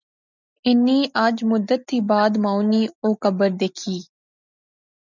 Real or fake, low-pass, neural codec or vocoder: real; 7.2 kHz; none